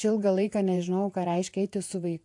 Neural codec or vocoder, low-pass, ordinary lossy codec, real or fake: vocoder, 48 kHz, 128 mel bands, Vocos; 10.8 kHz; AAC, 64 kbps; fake